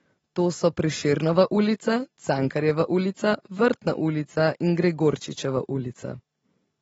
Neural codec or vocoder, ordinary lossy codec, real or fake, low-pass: none; AAC, 24 kbps; real; 19.8 kHz